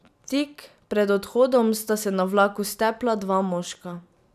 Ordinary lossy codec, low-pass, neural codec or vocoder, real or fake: none; 14.4 kHz; none; real